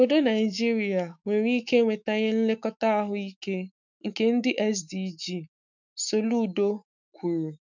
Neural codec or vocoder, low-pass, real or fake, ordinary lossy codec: autoencoder, 48 kHz, 128 numbers a frame, DAC-VAE, trained on Japanese speech; 7.2 kHz; fake; none